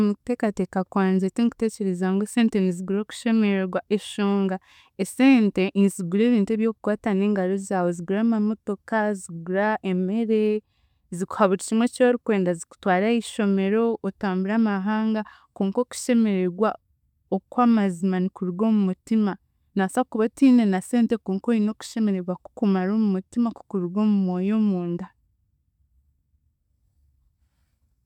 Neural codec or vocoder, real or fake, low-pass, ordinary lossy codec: none; real; 19.8 kHz; none